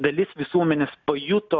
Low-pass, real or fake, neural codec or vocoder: 7.2 kHz; real; none